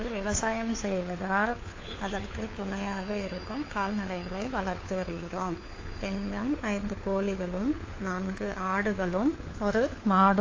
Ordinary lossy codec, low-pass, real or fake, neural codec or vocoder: AAC, 32 kbps; 7.2 kHz; fake; codec, 16 kHz, 4 kbps, FunCodec, trained on LibriTTS, 50 frames a second